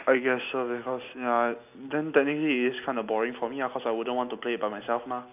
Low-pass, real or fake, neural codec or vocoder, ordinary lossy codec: 3.6 kHz; real; none; none